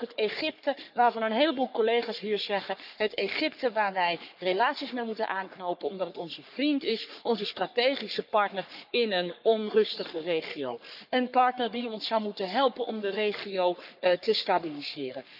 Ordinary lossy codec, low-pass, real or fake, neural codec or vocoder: none; 5.4 kHz; fake; codec, 44.1 kHz, 3.4 kbps, Pupu-Codec